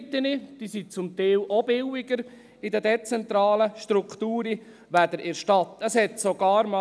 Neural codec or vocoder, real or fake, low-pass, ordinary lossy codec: none; real; none; none